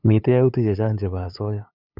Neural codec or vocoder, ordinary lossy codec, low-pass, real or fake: codec, 16 kHz, 8 kbps, FunCodec, trained on LibriTTS, 25 frames a second; none; 5.4 kHz; fake